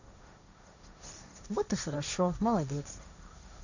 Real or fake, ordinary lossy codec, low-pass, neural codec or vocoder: fake; none; 7.2 kHz; codec, 16 kHz, 1.1 kbps, Voila-Tokenizer